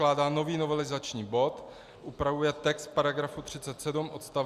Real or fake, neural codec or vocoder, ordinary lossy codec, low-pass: real; none; Opus, 64 kbps; 14.4 kHz